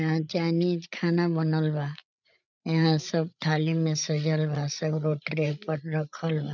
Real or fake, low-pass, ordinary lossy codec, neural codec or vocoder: fake; 7.2 kHz; none; codec, 16 kHz, 8 kbps, FreqCodec, larger model